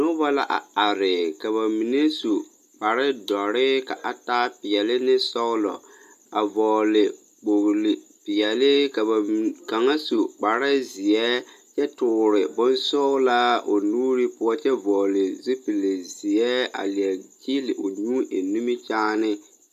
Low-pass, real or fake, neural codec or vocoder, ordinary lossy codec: 14.4 kHz; real; none; AAC, 96 kbps